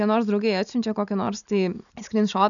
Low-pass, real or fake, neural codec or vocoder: 7.2 kHz; real; none